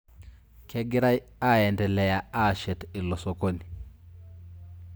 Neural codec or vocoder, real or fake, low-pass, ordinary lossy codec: none; real; none; none